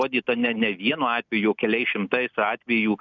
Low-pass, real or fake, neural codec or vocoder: 7.2 kHz; real; none